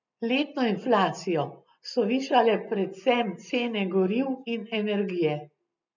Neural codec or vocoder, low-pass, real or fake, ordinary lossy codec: none; 7.2 kHz; real; none